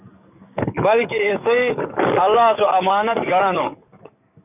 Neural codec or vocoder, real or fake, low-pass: codec, 44.1 kHz, 7.8 kbps, DAC; fake; 3.6 kHz